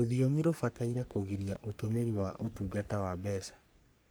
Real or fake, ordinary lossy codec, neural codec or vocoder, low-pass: fake; none; codec, 44.1 kHz, 3.4 kbps, Pupu-Codec; none